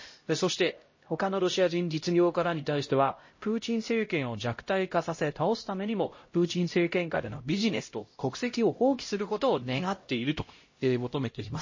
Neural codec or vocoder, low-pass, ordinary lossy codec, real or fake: codec, 16 kHz, 0.5 kbps, X-Codec, HuBERT features, trained on LibriSpeech; 7.2 kHz; MP3, 32 kbps; fake